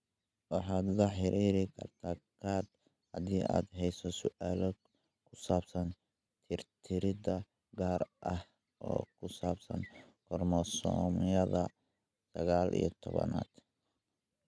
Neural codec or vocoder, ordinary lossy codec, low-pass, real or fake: none; none; none; real